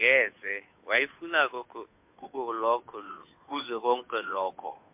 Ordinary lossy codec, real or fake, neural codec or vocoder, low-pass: MP3, 32 kbps; fake; codec, 16 kHz, 2 kbps, FunCodec, trained on Chinese and English, 25 frames a second; 3.6 kHz